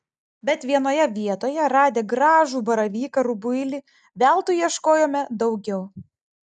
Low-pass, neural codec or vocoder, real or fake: 10.8 kHz; none; real